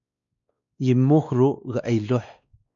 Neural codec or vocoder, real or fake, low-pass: codec, 16 kHz, 2 kbps, X-Codec, WavLM features, trained on Multilingual LibriSpeech; fake; 7.2 kHz